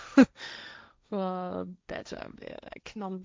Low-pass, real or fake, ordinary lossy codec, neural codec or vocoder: none; fake; none; codec, 16 kHz, 1.1 kbps, Voila-Tokenizer